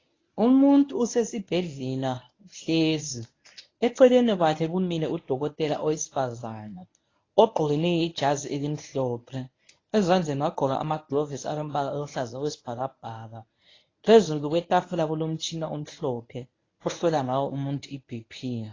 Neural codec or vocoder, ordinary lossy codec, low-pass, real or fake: codec, 24 kHz, 0.9 kbps, WavTokenizer, medium speech release version 1; AAC, 32 kbps; 7.2 kHz; fake